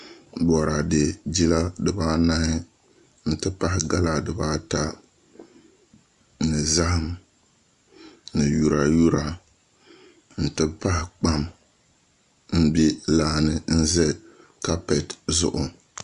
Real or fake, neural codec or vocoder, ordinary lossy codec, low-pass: real; none; Opus, 64 kbps; 10.8 kHz